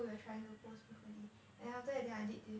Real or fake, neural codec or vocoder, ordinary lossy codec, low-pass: real; none; none; none